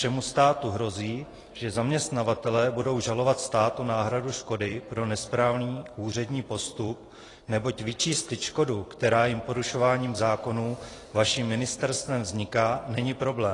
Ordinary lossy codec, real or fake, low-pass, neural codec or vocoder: AAC, 32 kbps; fake; 10.8 kHz; vocoder, 44.1 kHz, 128 mel bands every 256 samples, BigVGAN v2